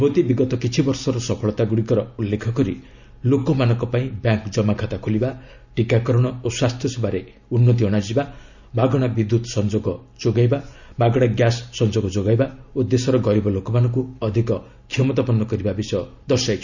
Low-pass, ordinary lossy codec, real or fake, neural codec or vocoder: 7.2 kHz; none; real; none